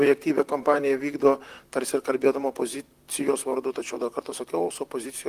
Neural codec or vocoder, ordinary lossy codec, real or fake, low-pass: vocoder, 44.1 kHz, 128 mel bands, Pupu-Vocoder; Opus, 24 kbps; fake; 14.4 kHz